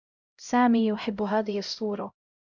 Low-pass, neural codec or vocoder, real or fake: 7.2 kHz; codec, 16 kHz, 0.5 kbps, X-Codec, HuBERT features, trained on LibriSpeech; fake